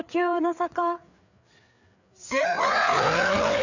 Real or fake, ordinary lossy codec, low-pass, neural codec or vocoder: fake; none; 7.2 kHz; codec, 16 kHz, 4 kbps, FreqCodec, larger model